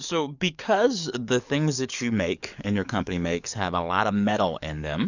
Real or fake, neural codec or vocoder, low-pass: fake; codec, 44.1 kHz, 7.8 kbps, DAC; 7.2 kHz